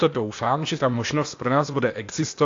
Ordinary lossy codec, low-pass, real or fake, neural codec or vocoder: AAC, 48 kbps; 7.2 kHz; fake; codec, 16 kHz, 0.8 kbps, ZipCodec